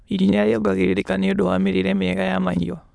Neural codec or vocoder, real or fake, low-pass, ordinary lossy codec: autoencoder, 22.05 kHz, a latent of 192 numbers a frame, VITS, trained on many speakers; fake; none; none